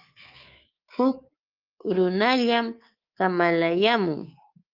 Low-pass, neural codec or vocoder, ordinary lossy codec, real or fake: 5.4 kHz; codec, 24 kHz, 3.1 kbps, DualCodec; Opus, 24 kbps; fake